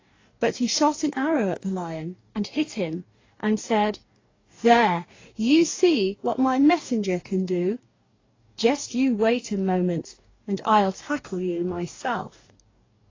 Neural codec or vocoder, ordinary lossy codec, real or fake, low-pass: codec, 44.1 kHz, 2.6 kbps, DAC; AAC, 32 kbps; fake; 7.2 kHz